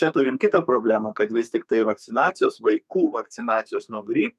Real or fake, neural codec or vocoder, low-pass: fake; codec, 32 kHz, 1.9 kbps, SNAC; 14.4 kHz